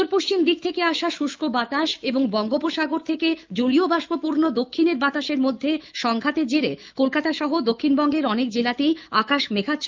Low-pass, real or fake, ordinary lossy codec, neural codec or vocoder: 7.2 kHz; fake; Opus, 32 kbps; autoencoder, 48 kHz, 128 numbers a frame, DAC-VAE, trained on Japanese speech